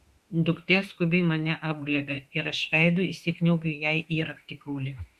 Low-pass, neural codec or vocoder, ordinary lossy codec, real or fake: 14.4 kHz; autoencoder, 48 kHz, 32 numbers a frame, DAC-VAE, trained on Japanese speech; Opus, 64 kbps; fake